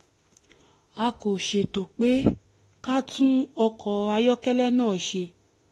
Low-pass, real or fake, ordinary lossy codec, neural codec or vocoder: 19.8 kHz; fake; AAC, 32 kbps; autoencoder, 48 kHz, 32 numbers a frame, DAC-VAE, trained on Japanese speech